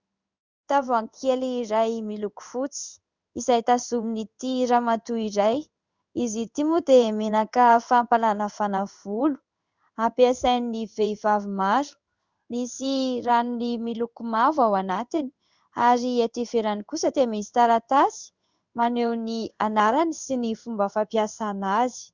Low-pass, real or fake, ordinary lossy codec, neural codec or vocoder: 7.2 kHz; fake; Opus, 64 kbps; codec, 16 kHz in and 24 kHz out, 1 kbps, XY-Tokenizer